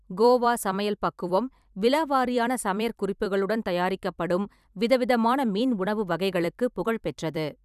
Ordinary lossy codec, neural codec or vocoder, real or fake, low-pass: none; vocoder, 44.1 kHz, 128 mel bands every 256 samples, BigVGAN v2; fake; 14.4 kHz